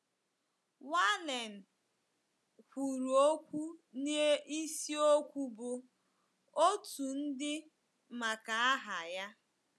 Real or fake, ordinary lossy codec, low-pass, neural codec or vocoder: real; none; none; none